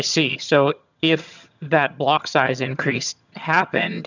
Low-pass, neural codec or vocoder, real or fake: 7.2 kHz; vocoder, 22.05 kHz, 80 mel bands, HiFi-GAN; fake